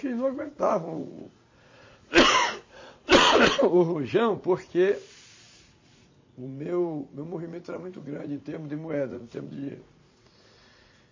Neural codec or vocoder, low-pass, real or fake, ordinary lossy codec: vocoder, 44.1 kHz, 80 mel bands, Vocos; 7.2 kHz; fake; MP3, 32 kbps